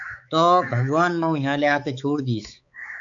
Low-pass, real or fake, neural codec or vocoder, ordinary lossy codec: 7.2 kHz; fake; codec, 16 kHz, 4 kbps, X-Codec, HuBERT features, trained on balanced general audio; AAC, 64 kbps